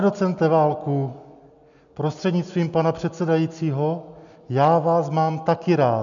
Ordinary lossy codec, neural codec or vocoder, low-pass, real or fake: MP3, 96 kbps; none; 7.2 kHz; real